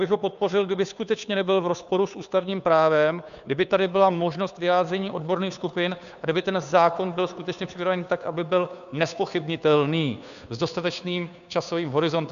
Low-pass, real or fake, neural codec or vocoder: 7.2 kHz; fake; codec, 16 kHz, 2 kbps, FunCodec, trained on Chinese and English, 25 frames a second